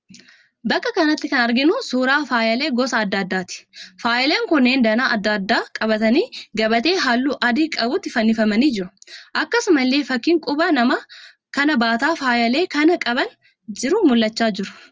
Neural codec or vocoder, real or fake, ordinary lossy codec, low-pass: none; real; Opus, 24 kbps; 7.2 kHz